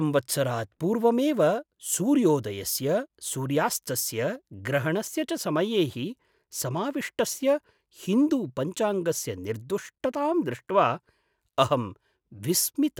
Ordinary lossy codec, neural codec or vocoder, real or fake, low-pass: none; none; real; none